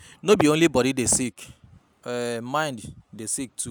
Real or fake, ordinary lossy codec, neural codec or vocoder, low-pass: real; none; none; none